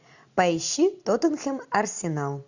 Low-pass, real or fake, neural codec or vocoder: 7.2 kHz; real; none